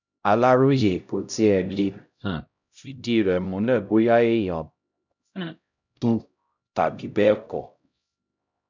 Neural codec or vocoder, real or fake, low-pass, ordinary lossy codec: codec, 16 kHz, 0.5 kbps, X-Codec, HuBERT features, trained on LibriSpeech; fake; 7.2 kHz; none